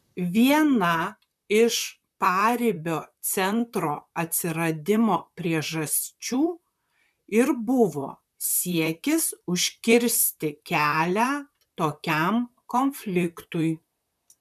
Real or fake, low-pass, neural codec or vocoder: fake; 14.4 kHz; vocoder, 44.1 kHz, 128 mel bands, Pupu-Vocoder